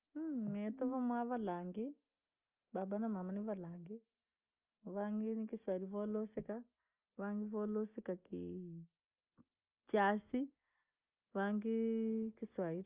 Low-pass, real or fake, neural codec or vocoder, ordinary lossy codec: 3.6 kHz; real; none; Opus, 32 kbps